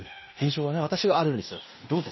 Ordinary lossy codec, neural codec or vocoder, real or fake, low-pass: MP3, 24 kbps; codec, 16 kHz in and 24 kHz out, 0.9 kbps, LongCat-Audio-Codec, fine tuned four codebook decoder; fake; 7.2 kHz